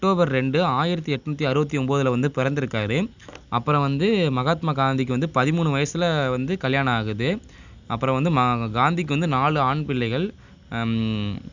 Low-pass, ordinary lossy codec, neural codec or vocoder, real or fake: 7.2 kHz; none; none; real